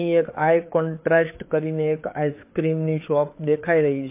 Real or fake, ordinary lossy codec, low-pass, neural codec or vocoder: fake; MP3, 24 kbps; 3.6 kHz; codec, 16 kHz, 4 kbps, FreqCodec, larger model